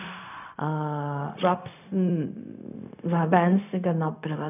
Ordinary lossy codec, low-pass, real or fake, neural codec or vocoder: none; 3.6 kHz; fake; codec, 16 kHz, 0.4 kbps, LongCat-Audio-Codec